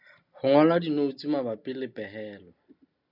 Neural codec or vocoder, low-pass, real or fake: none; 5.4 kHz; real